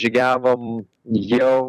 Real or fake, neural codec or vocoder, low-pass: fake; vocoder, 44.1 kHz, 128 mel bands every 256 samples, BigVGAN v2; 14.4 kHz